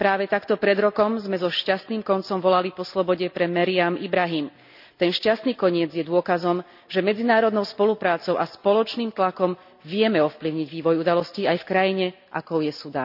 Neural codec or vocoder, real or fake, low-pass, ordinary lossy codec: none; real; 5.4 kHz; none